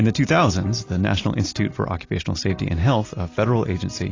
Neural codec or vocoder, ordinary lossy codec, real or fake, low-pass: none; AAC, 32 kbps; real; 7.2 kHz